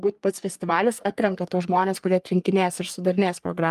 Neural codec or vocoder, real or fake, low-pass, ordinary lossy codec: codec, 44.1 kHz, 3.4 kbps, Pupu-Codec; fake; 14.4 kHz; Opus, 32 kbps